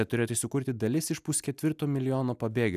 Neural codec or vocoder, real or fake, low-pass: none; real; 14.4 kHz